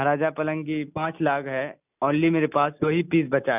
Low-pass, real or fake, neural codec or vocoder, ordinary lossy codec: 3.6 kHz; real; none; none